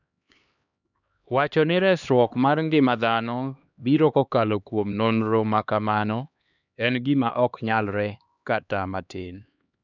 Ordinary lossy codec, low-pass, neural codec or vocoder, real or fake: none; 7.2 kHz; codec, 16 kHz, 2 kbps, X-Codec, HuBERT features, trained on LibriSpeech; fake